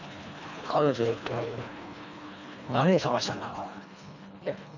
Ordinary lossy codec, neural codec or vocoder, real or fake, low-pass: none; codec, 24 kHz, 1.5 kbps, HILCodec; fake; 7.2 kHz